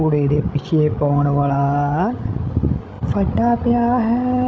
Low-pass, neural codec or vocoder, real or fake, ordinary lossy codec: none; codec, 16 kHz, 16 kbps, FreqCodec, larger model; fake; none